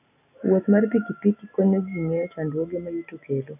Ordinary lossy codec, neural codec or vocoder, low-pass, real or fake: none; none; 3.6 kHz; real